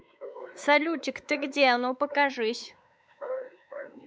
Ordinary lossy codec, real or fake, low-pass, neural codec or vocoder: none; fake; none; codec, 16 kHz, 4 kbps, X-Codec, WavLM features, trained on Multilingual LibriSpeech